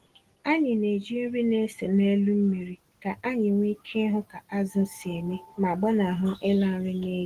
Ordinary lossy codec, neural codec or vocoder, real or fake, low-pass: Opus, 16 kbps; none; real; 14.4 kHz